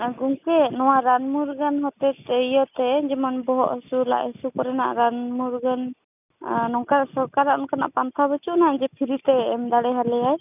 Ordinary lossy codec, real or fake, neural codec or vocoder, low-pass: none; real; none; 3.6 kHz